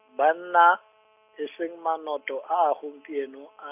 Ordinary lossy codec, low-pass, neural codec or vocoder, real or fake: none; 3.6 kHz; none; real